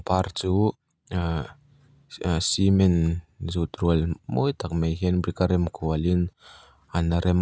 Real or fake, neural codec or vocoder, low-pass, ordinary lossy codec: real; none; none; none